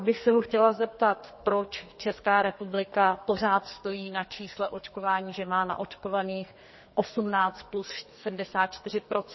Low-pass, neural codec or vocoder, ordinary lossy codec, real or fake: 7.2 kHz; codec, 44.1 kHz, 2.6 kbps, SNAC; MP3, 24 kbps; fake